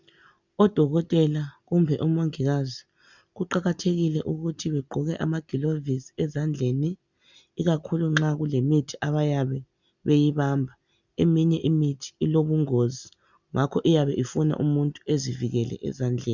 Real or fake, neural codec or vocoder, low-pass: real; none; 7.2 kHz